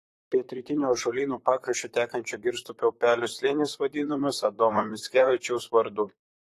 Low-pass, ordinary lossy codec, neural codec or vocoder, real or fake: 14.4 kHz; AAC, 48 kbps; vocoder, 44.1 kHz, 128 mel bands, Pupu-Vocoder; fake